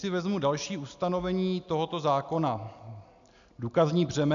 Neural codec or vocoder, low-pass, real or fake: none; 7.2 kHz; real